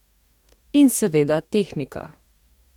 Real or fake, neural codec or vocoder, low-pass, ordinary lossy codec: fake; codec, 44.1 kHz, 2.6 kbps, DAC; 19.8 kHz; none